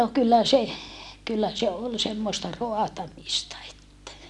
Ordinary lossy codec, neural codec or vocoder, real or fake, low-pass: none; none; real; none